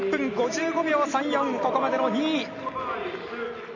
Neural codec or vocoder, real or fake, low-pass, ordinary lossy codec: none; real; 7.2 kHz; none